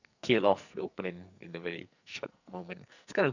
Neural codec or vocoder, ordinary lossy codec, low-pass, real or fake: codec, 44.1 kHz, 2.6 kbps, SNAC; none; 7.2 kHz; fake